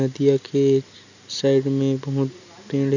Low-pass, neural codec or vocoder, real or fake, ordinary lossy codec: 7.2 kHz; none; real; none